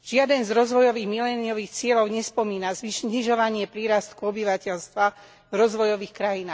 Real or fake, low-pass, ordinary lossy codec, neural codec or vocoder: real; none; none; none